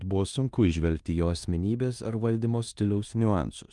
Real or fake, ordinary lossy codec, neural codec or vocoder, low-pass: fake; Opus, 32 kbps; codec, 16 kHz in and 24 kHz out, 0.9 kbps, LongCat-Audio-Codec, four codebook decoder; 10.8 kHz